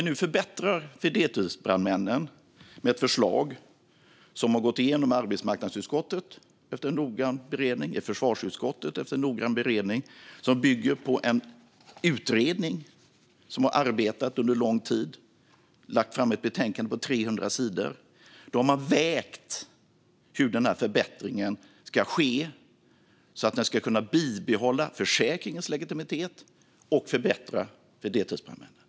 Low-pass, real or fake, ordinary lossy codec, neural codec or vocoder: none; real; none; none